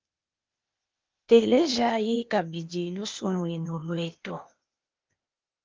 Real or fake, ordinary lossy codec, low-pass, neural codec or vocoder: fake; Opus, 24 kbps; 7.2 kHz; codec, 16 kHz, 0.8 kbps, ZipCodec